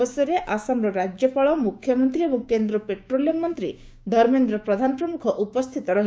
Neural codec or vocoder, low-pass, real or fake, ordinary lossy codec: codec, 16 kHz, 6 kbps, DAC; none; fake; none